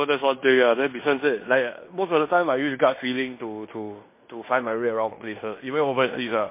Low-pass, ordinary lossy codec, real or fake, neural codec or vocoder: 3.6 kHz; MP3, 24 kbps; fake; codec, 16 kHz in and 24 kHz out, 0.9 kbps, LongCat-Audio-Codec, fine tuned four codebook decoder